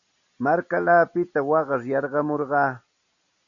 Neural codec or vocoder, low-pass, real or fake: none; 7.2 kHz; real